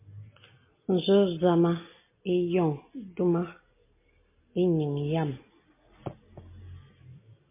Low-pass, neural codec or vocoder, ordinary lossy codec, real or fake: 3.6 kHz; none; MP3, 24 kbps; real